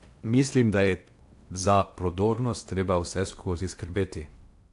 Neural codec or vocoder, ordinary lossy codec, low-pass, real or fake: codec, 16 kHz in and 24 kHz out, 0.8 kbps, FocalCodec, streaming, 65536 codes; AAC, 64 kbps; 10.8 kHz; fake